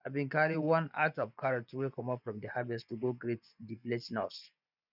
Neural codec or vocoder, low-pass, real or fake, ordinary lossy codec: vocoder, 24 kHz, 100 mel bands, Vocos; 5.4 kHz; fake; none